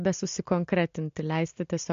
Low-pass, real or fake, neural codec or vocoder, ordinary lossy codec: 7.2 kHz; real; none; MP3, 48 kbps